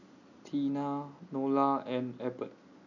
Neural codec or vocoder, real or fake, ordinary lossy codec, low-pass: none; real; none; 7.2 kHz